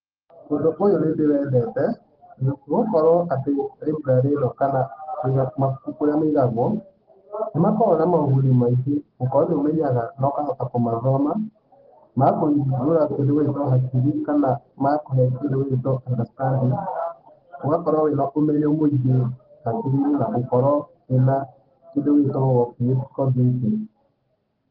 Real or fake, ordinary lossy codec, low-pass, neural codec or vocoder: real; Opus, 16 kbps; 5.4 kHz; none